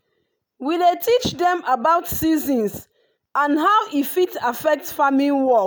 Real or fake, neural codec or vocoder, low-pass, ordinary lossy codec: real; none; none; none